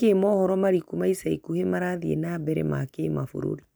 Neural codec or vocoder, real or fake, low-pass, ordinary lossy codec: none; real; none; none